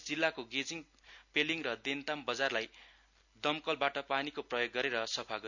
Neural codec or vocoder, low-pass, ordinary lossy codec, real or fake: none; 7.2 kHz; none; real